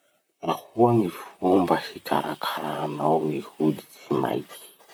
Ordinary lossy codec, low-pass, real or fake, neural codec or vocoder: none; none; fake; vocoder, 44.1 kHz, 128 mel bands, Pupu-Vocoder